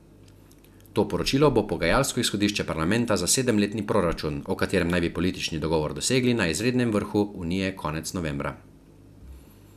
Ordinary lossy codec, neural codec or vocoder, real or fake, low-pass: none; none; real; 14.4 kHz